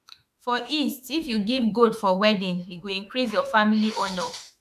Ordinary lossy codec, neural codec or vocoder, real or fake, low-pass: none; autoencoder, 48 kHz, 32 numbers a frame, DAC-VAE, trained on Japanese speech; fake; 14.4 kHz